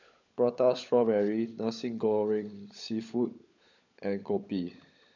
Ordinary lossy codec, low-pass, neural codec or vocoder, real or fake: none; 7.2 kHz; codec, 16 kHz, 16 kbps, FunCodec, trained on LibriTTS, 50 frames a second; fake